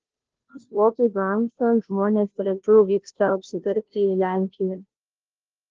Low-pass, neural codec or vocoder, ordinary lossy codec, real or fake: 7.2 kHz; codec, 16 kHz, 0.5 kbps, FunCodec, trained on Chinese and English, 25 frames a second; Opus, 16 kbps; fake